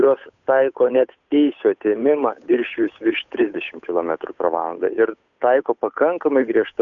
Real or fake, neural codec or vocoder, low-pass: fake; codec, 16 kHz, 8 kbps, FunCodec, trained on Chinese and English, 25 frames a second; 7.2 kHz